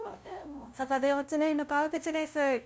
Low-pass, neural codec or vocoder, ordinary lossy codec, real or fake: none; codec, 16 kHz, 0.5 kbps, FunCodec, trained on LibriTTS, 25 frames a second; none; fake